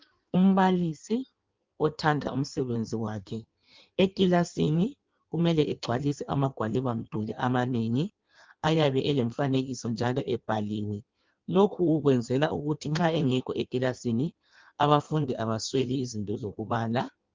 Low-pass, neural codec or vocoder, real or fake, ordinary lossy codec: 7.2 kHz; codec, 16 kHz in and 24 kHz out, 1.1 kbps, FireRedTTS-2 codec; fake; Opus, 24 kbps